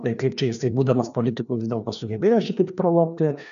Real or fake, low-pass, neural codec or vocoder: fake; 7.2 kHz; codec, 16 kHz, 2 kbps, FreqCodec, larger model